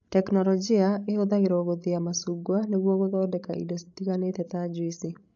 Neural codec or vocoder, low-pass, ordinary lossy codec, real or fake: codec, 16 kHz, 16 kbps, FreqCodec, larger model; 7.2 kHz; none; fake